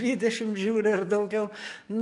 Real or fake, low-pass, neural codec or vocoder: fake; 10.8 kHz; codec, 44.1 kHz, 7.8 kbps, DAC